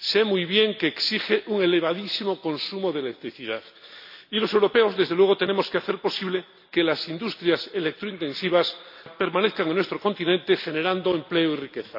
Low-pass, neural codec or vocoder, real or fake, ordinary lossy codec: 5.4 kHz; none; real; AAC, 48 kbps